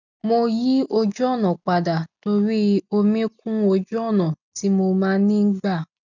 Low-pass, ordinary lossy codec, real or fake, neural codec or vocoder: 7.2 kHz; none; real; none